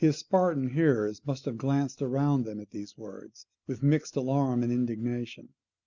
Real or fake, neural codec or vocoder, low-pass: real; none; 7.2 kHz